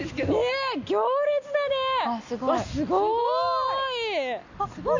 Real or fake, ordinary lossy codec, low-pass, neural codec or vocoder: real; none; 7.2 kHz; none